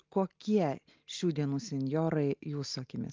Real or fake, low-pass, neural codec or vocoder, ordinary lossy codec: real; 7.2 kHz; none; Opus, 32 kbps